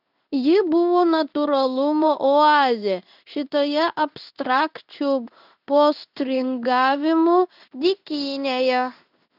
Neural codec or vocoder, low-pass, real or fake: codec, 16 kHz in and 24 kHz out, 1 kbps, XY-Tokenizer; 5.4 kHz; fake